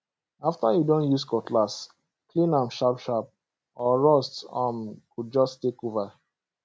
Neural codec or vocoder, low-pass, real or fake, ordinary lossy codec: none; none; real; none